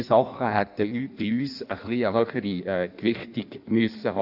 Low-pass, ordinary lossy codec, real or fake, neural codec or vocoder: 5.4 kHz; none; fake; codec, 16 kHz in and 24 kHz out, 1.1 kbps, FireRedTTS-2 codec